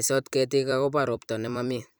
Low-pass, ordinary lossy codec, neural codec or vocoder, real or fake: none; none; vocoder, 44.1 kHz, 128 mel bands every 256 samples, BigVGAN v2; fake